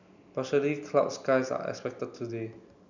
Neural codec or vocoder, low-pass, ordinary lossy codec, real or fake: none; 7.2 kHz; none; real